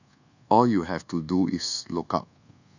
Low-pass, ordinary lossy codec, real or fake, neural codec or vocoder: 7.2 kHz; none; fake; codec, 24 kHz, 1.2 kbps, DualCodec